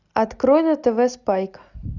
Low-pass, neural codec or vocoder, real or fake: 7.2 kHz; none; real